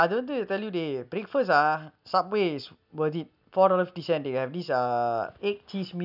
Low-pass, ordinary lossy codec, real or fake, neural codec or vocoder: 5.4 kHz; none; real; none